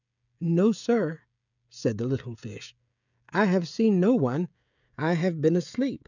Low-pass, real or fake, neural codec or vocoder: 7.2 kHz; fake; codec, 16 kHz, 16 kbps, FreqCodec, smaller model